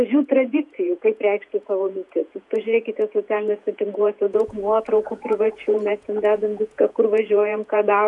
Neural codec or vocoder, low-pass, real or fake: vocoder, 48 kHz, 128 mel bands, Vocos; 10.8 kHz; fake